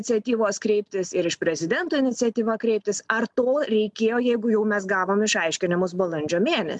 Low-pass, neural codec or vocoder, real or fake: 10.8 kHz; none; real